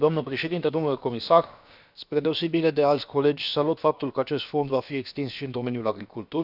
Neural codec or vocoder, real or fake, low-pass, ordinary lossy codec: codec, 16 kHz, about 1 kbps, DyCAST, with the encoder's durations; fake; 5.4 kHz; none